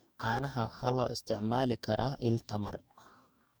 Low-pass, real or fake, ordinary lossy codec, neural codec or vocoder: none; fake; none; codec, 44.1 kHz, 2.6 kbps, DAC